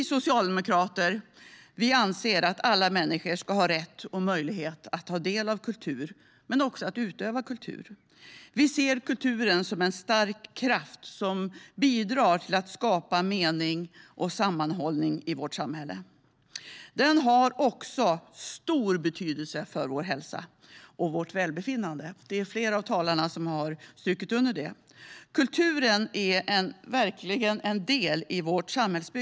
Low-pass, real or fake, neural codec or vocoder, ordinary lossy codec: none; real; none; none